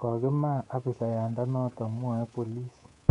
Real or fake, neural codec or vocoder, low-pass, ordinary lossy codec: fake; codec, 24 kHz, 3.1 kbps, DualCodec; 10.8 kHz; MP3, 64 kbps